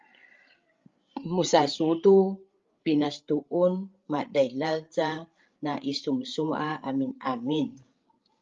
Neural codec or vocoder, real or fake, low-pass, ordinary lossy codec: codec, 16 kHz, 8 kbps, FreqCodec, larger model; fake; 7.2 kHz; Opus, 32 kbps